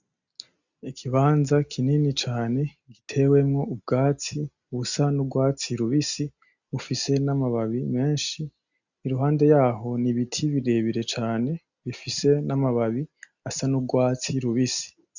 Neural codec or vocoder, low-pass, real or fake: none; 7.2 kHz; real